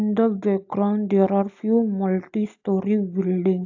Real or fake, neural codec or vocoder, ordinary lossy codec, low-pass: real; none; AAC, 48 kbps; 7.2 kHz